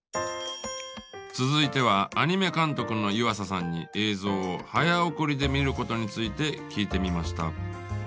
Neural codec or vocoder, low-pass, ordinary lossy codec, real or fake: none; none; none; real